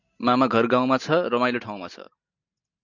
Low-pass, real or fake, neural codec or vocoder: 7.2 kHz; real; none